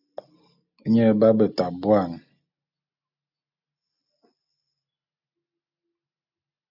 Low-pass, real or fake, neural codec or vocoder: 5.4 kHz; real; none